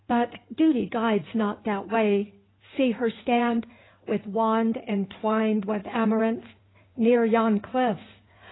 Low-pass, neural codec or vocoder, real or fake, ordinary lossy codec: 7.2 kHz; codec, 16 kHz in and 24 kHz out, 2.2 kbps, FireRedTTS-2 codec; fake; AAC, 16 kbps